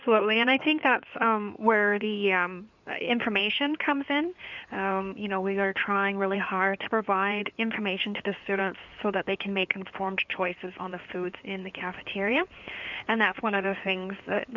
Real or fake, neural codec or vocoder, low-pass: fake; codec, 16 kHz in and 24 kHz out, 2.2 kbps, FireRedTTS-2 codec; 7.2 kHz